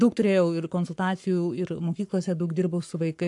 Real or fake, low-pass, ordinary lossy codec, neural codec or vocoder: fake; 10.8 kHz; AAC, 64 kbps; codec, 44.1 kHz, 7.8 kbps, Pupu-Codec